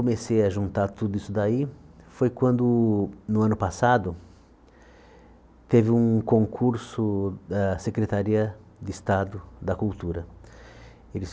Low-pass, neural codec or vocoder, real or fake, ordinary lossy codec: none; none; real; none